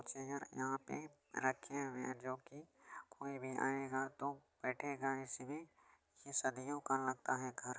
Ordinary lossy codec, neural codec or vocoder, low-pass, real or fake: none; none; none; real